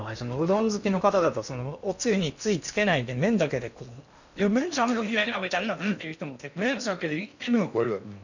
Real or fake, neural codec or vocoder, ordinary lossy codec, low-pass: fake; codec, 16 kHz in and 24 kHz out, 0.8 kbps, FocalCodec, streaming, 65536 codes; none; 7.2 kHz